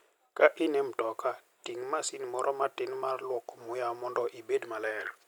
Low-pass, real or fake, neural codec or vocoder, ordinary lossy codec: none; real; none; none